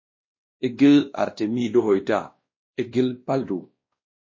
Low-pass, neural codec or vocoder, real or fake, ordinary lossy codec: 7.2 kHz; codec, 16 kHz, 1 kbps, X-Codec, WavLM features, trained on Multilingual LibriSpeech; fake; MP3, 32 kbps